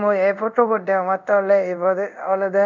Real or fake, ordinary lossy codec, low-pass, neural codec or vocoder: fake; none; 7.2 kHz; codec, 24 kHz, 0.5 kbps, DualCodec